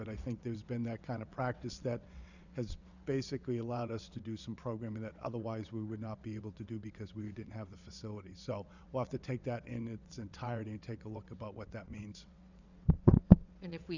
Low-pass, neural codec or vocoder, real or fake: 7.2 kHz; vocoder, 22.05 kHz, 80 mel bands, WaveNeXt; fake